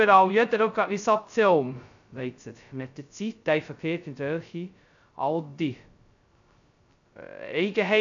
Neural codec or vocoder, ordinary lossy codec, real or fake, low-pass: codec, 16 kHz, 0.2 kbps, FocalCodec; none; fake; 7.2 kHz